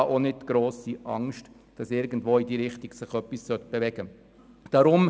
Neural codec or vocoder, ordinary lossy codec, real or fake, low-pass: none; none; real; none